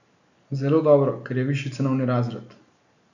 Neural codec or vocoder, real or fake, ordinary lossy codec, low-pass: none; real; none; 7.2 kHz